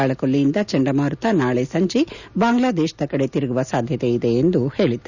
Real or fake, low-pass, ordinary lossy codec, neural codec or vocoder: real; 7.2 kHz; none; none